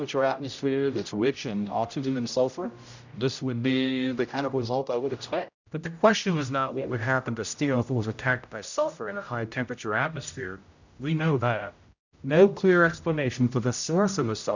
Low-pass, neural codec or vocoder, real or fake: 7.2 kHz; codec, 16 kHz, 0.5 kbps, X-Codec, HuBERT features, trained on general audio; fake